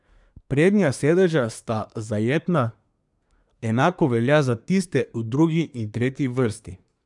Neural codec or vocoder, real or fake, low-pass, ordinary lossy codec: codec, 24 kHz, 1 kbps, SNAC; fake; 10.8 kHz; none